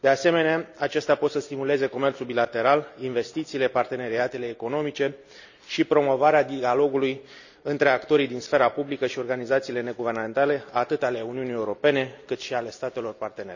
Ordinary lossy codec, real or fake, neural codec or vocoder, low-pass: none; real; none; 7.2 kHz